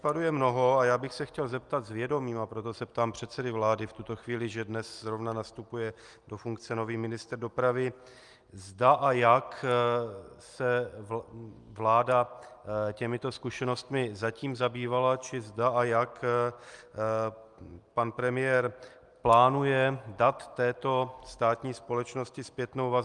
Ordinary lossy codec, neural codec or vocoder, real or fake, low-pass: Opus, 32 kbps; none; real; 10.8 kHz